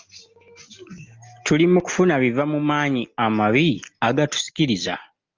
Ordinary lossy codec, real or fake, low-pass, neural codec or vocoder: Opus, 24 kbps; real; 7.2 kHz; none